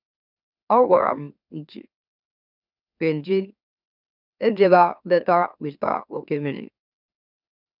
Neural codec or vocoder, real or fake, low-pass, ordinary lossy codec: autoencoder, 44.1 kHz, a latent of 192 numbers a frame, MeloTTS; fake; 5.4 kHz; none